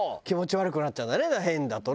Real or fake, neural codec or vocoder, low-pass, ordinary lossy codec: real; none; none; none